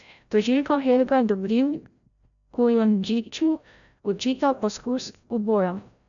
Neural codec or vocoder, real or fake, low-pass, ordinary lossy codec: codec, 16 kHz, 0.5 kbps, FreqCodec, larger model; fake; 7.2 kHz; none